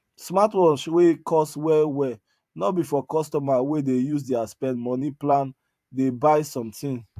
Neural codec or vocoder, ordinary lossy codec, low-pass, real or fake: vocoder, 44.1 kHz, 128 mel bands every 512 samples, BigVGAN v2; AAC, 96 kbps; 14.4 kHz; fake